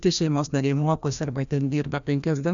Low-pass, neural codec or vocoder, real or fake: 7.2 kHz; codec, 16 kHz, 1 kbps, FreqCodec, larger model; fake